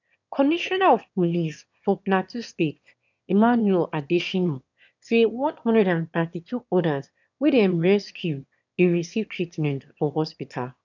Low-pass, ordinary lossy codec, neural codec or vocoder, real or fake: 7.2 kHz; none; autoencoder, 22.05 kHz, a latent of 192 numbers a frame, VITS, trained on one speaker; fake